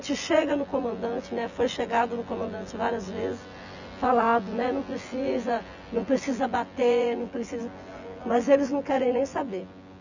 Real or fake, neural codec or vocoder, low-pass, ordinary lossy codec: fake; vocoder, 24 kHz, 100 mel bands, Vocos; 7.2 kHz; none